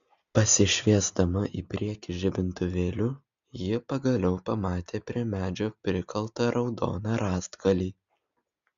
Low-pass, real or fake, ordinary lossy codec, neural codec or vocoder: 7.2 kHz; real; MP3, 96 kbps; none